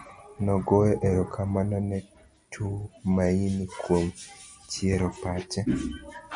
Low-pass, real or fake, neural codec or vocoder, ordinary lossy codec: 9.9 kHz; real; none; MP3, 96 kbps